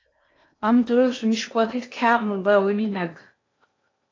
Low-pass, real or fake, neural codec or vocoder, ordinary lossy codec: 7.2 kHz; fake; codec, 16 kHz in and 24 kHz out, 0.8 kbps, FocalCodec, streaming, 65536 codes; AAC, 32 kbps